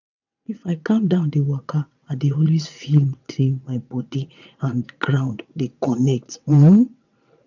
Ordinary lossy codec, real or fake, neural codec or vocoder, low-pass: none; fake; vocoder, 22.05 kHz, 80 mel bands, WaveNeXt; 7.2 kHz